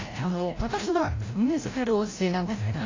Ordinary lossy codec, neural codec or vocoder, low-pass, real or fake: AAC, 32 kbps; codec, 16 kHz, 0.5 kbps, FreqCodec, larger model; 7.2 kHz; fake